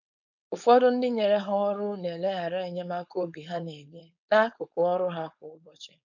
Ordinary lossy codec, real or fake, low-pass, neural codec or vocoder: none; fake; 7.2 kHz; codec, 16 kHz, 4.8 kbps, FACodec